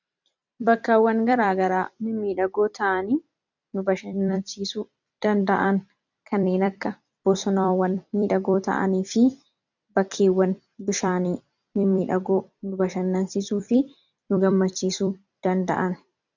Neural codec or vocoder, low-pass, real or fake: vocoder, 44.1 kHz, 128 mel bands every 256 samples, BigVGAN v2; 7.2 kHz; fake